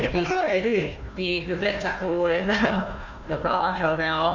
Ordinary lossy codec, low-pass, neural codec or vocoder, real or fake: none; 7.2 kHz; codec, 16 kHz, 1 kbps, FunCodec, trained on Chinese and English, 50 frames a second; fake